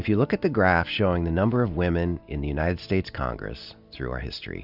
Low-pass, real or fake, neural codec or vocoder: 5.4 kHz; real; none